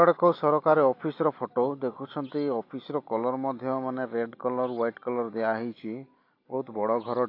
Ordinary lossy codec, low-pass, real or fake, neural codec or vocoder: AAC, 32 kbps; 5.4 kHz; real; none